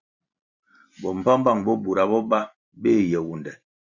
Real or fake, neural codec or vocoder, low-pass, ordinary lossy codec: fake; vocoder, 44.1 kHz, 128 mel bands every 512 samples, BigVGAN v2; 7.2 kHz; Opus, 64 kbps